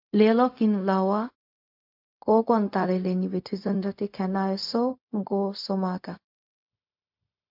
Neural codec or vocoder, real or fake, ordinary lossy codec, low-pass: codec, 16 kHz, 0.4 kbps, LongCat-Audio-Codec; fake; MP3, 48 kbps; 5.4 kHz